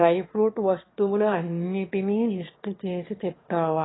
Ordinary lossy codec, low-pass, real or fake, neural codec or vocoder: AAC, 16 kbps; 7.2 kHz; fake; autoencoder, 22.05 kHz, a latent of 192 numbers a frame, VITS, trained on one speaker